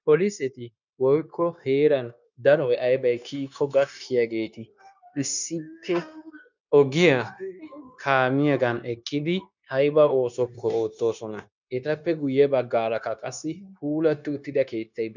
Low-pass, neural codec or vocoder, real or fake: 7.2 kHz; codec, 16 kHz, 0.9 kbps, LongCat-Audio-Codec; fake